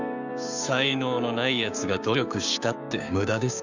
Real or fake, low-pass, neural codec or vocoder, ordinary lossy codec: fake; 7.2 kHz; codec, 16 kHz, 6 kbps, DAC; none